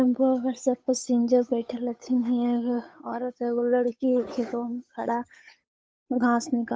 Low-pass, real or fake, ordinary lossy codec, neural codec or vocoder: none; fake; none; codec, 16 kHz, 8 kbps, FunCodec, trained on Chinese and English, 25 frames a second